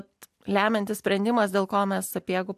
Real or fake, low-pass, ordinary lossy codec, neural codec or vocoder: real; 14.4 kHz; AAC, 96 kbps; none